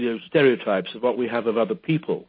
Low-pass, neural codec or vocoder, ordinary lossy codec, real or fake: 5.4 kHz; none; MP3, 24 kbps; real